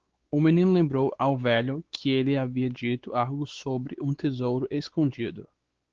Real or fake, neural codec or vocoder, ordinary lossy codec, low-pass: fake; codec, 16 kHz, 4 kbps, X-Codec, WavLM features, trained on Multilingual LibriSpeech; Opus, 16 kbps; 7.2 kHz